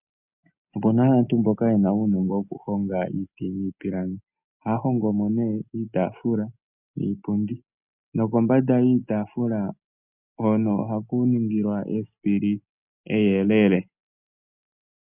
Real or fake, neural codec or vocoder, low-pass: real; none; 3.6 kHz